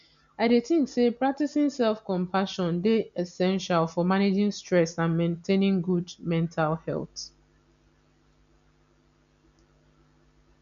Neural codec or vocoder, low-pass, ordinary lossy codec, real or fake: none; 7.2 kHz; none; real